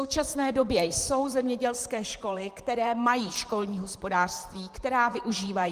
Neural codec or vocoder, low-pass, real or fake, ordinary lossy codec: vocoder, 44.1 kHz, 128 mel bands, Pupu-Vocoder; 14.4 kHz; fake; Opus, 24 kbps